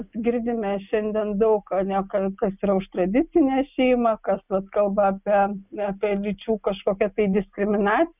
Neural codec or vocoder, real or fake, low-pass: none; real; 3.6 kHz